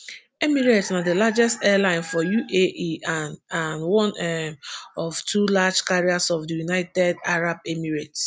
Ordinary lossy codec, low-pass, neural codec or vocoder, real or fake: none; none; none; real